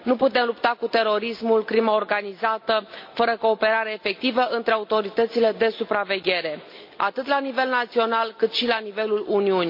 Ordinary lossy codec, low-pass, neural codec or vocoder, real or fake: MP3, 32 kbps; 5.4 kHz; none; real